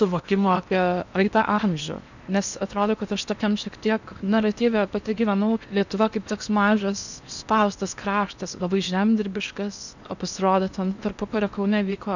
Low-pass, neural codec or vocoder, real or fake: 7.2 kHz; codec, 16 kHz in and 24 kHz out, 0.8 kbps, FocalCodec, streaming, 65536 codes; fake